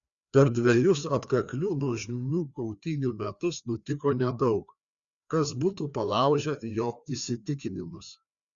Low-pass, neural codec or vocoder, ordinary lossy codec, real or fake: 7.2 kHz; codec, 16 kHz, 2 kbps, FreqCodec, larger model; Opus, 64 kbps; fake